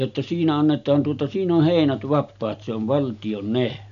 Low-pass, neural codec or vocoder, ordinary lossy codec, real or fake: 7.2 kHz; none; none; real